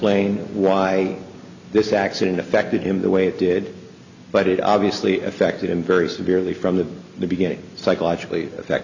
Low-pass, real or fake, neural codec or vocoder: 7.2 kHz; real; none